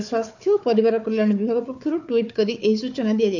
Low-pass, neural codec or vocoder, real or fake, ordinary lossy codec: 7.2 kHz; codec, 16 kHz, 4 kbps, FunCodec, trained on Chinese and English, 50 frames a second; fake; none